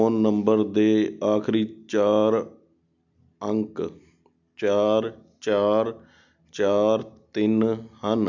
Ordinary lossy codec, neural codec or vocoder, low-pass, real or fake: none; none; 7.2 kHz; real